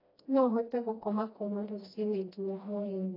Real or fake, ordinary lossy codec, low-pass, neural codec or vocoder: fake; AAC, 24 kbps; 5.4 kHz; codec, 16 kHz, 1 kbps, FreqCodec, smaller model